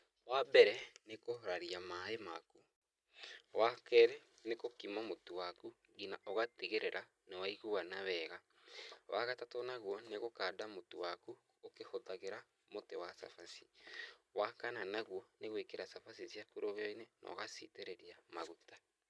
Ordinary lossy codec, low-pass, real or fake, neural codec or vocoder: none; none; real; none